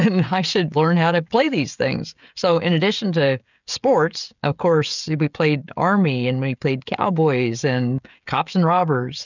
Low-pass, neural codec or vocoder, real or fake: 7.2 kHz; codec, 16 kHz, 16 kbps, FreqCodec, smaller model; fake